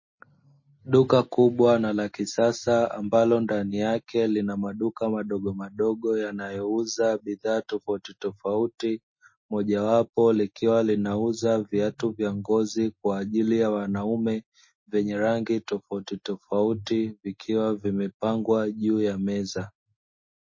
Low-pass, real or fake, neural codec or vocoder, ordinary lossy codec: 7.2 kHz; real; none; MP3, 32 kbps